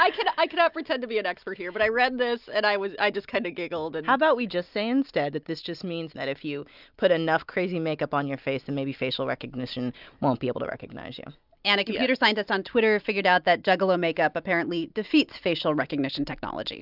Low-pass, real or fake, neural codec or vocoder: 5.4 kHz; real; none